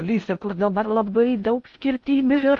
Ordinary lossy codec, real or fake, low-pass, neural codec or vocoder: MP3, 64 kbps; fake; 10.8 kHz; codec, 16 kHz in and 24 kHz out, 0.6 kbps, FocalCodec, streaming, 4096 codes